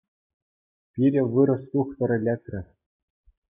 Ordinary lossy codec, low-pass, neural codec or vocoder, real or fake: AAC, 24 kbps; 3.6 kHz; none; real